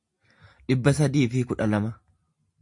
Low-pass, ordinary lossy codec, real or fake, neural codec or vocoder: 10.8 kHz; MP3, 64 kbps; real; none